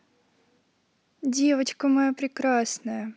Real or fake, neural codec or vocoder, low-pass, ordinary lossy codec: real; none; none; none